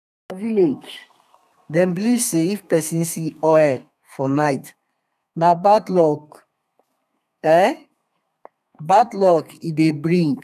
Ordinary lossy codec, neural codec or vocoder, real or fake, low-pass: none; codec, 32 kHz, 1.9 kbps, SNAC; fake; 14.4 kHz